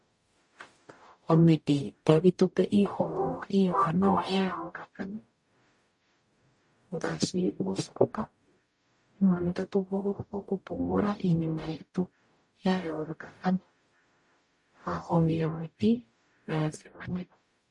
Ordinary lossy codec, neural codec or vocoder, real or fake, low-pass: MP3, 48 kbps; codec, 44.1 kHz, 0.9 kbps, DAC; fake; 10.8 kHz